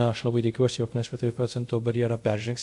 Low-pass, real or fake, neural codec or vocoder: 10.8 kHz; fake; codec, 24 kHz, 0.5 kbps, DualCodec